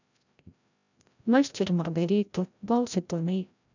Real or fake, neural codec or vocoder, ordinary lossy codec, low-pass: fake; codec, 16 kHz, 0.5 kbps, FreqCodec, larger model; none; 7.2 kHz